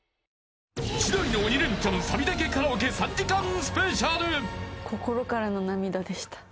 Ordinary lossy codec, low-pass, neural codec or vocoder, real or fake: none; none; none; real